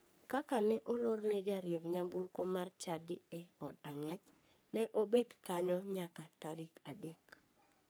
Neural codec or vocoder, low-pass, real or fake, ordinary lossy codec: codec, 44.1 kHz, 3.4 kbps, Pupu-Codec; none; fake; none